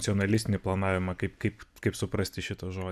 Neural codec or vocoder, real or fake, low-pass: none; real; 14.4 kHz